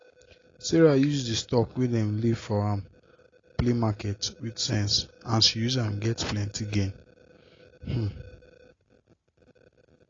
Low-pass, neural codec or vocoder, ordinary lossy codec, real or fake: 7.2 kHz; none; AAC, 32 kbps; real